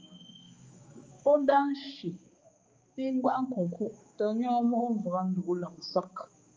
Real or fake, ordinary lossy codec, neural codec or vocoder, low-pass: fake; Opus, 32 kbps; codec, 16 kHz, 4 kbps, X-Codec, HuBERT features, trained on balanced general audio; 7.2 kHz